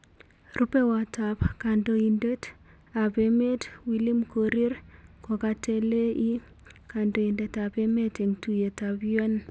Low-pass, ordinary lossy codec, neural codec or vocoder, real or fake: none; none; none; real